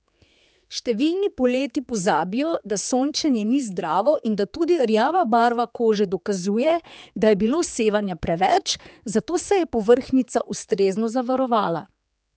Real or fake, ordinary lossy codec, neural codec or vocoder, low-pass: fake; none; codec, 16 kHz, 4 kbps, X-Codec, HuBERT features, trained on general audio; none